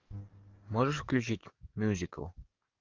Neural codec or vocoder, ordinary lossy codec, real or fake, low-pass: codec, 44.1 kHz, 7.8 kbps, DAC; Opus, 24 kbps; fake; 7.2 kHz